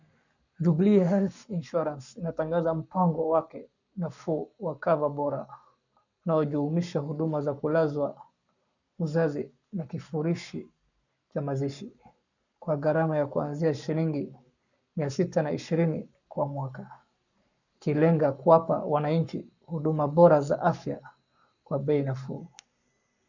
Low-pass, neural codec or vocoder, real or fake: 7.2 kHz; codec, 44.1 kHz, 7.8 kbps, Pupu-Codec; fake